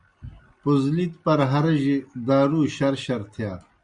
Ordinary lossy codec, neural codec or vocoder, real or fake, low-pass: Opus, 64 kbps; none; real; 9.9 kHz